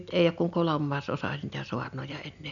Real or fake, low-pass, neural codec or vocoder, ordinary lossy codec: real; 7.2 kHz; none; none